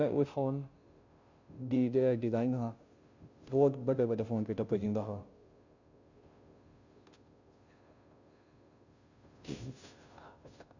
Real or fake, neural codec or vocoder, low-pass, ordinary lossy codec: fake; codec, 16 kHz, 0.5 kbps, FunCodec, trained on Chinese and English, 25 frames a second; 7.2 kHz; none